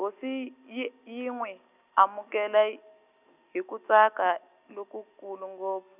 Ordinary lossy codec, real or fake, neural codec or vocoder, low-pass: none; real; none; 3.6 kHz